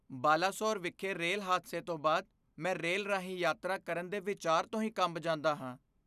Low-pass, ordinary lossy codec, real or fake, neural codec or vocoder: 14.4 kHz; none; real; none